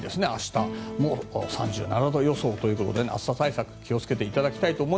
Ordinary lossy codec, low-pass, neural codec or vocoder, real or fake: none; none; none; real